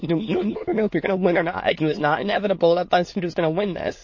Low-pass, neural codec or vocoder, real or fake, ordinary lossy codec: 7.2 kHz; autoencoder, 22.05 kHz, a latent of 192 numbers a frame, VITS, trained on many speakers; fake; MP3, 32 kbps